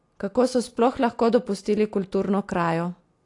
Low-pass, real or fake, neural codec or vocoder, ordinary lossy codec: 10.8 kHz; real; none; AAC, 48 kbps